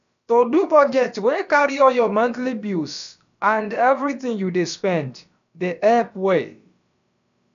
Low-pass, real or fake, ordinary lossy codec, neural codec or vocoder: 7.2 kHz; fake; none; codec, 16 kHz, about 1 kbps, DyCAST, with the encoder's durations